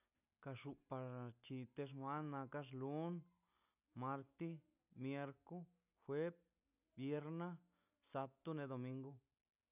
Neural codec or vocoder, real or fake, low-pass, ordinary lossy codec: none; real; 3.6 kHz; none